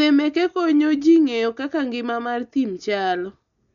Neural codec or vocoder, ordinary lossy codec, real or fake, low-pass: none; none; real; 7.2 kHz